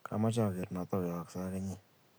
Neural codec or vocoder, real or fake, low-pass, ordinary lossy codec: none; real; none; none